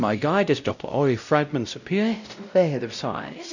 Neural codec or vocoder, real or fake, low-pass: codec, 16 kHz, 0.5 kbps, X-Codec, WavLM features, trained on Multilingual LibriSpeech; fake; 7.2 kHz